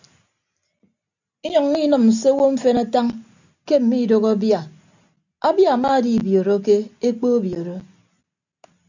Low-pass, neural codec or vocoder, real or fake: 7.2 kHz; none; real